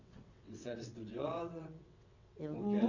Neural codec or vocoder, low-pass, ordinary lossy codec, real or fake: vocoder, 22.05 kHz, 80 mel bands, WaveNeXt; 7.2 kHz; none; fake